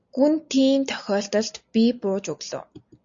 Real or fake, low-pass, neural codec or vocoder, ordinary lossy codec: real; 7.2 kHz; none; AAC, 64 kbps